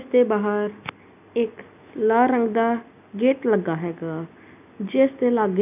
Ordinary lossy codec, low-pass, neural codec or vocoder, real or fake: none; 3.6 kHz; none; real